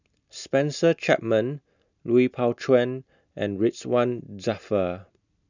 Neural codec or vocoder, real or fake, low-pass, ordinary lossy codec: none; real; 7.2 kHz; none